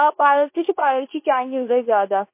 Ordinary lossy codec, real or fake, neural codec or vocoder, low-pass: MP3, 24 kbps; fake; codec, 24 kHz, 0.9 kbps, WavTokenizer, medium speech release version 2; 3.6 kHz